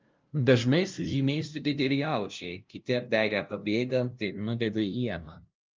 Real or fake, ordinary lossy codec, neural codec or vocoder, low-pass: fake; Opus, 24 kbps; codec, 16 kHz, 0.5 kbps, FunCodec, trained on LibriTTS, 25 frames a second; 7.2 kHz